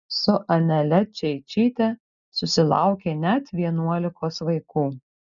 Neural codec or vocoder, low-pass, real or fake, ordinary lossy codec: none; 7.2 kHz; real; MP3, 96 kbps